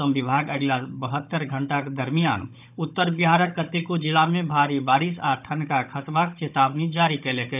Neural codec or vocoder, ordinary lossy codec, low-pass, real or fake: codec, 16 kHz, 16 kbps, FunCodec, trained on Chinese and English, 50 frames a second; none; 3.6 kHz; fake